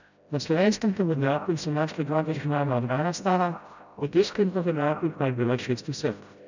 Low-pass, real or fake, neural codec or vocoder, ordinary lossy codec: 7.2 kHz; fake; codec, 16 kHz, 0.5 kbps, FreqCodec, smaller model; none